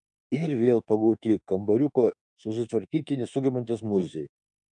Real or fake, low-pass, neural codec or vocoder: fake; 10.8 kHz; autoencoder, 48 kHz, 32 numbers a frame, DAC-VAE, trained on Japanese speech